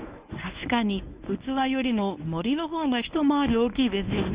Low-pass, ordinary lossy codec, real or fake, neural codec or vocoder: 3.6 kHz; Opus, 64 kbps; fake; codec, 24 kHz, 0.9 kbps, WavTokenizer, medium speech release version 1